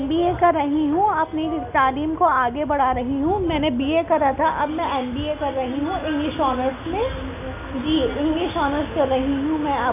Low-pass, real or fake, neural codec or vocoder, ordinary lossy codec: 3.6 kHz; real; none; none